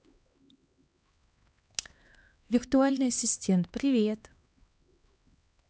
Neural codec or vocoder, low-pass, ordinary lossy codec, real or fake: codec, 16 kHz, 2 kbps, X-Codec, HuBERT features, trained on LibriSpeech; none; none; fake